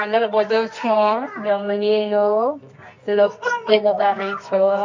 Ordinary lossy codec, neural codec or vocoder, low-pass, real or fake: MP3, 48 kbps; codec, 24 kHz, 0.9 kbps, WavTokenizer, medium music audio release; 7.2 kHz; fake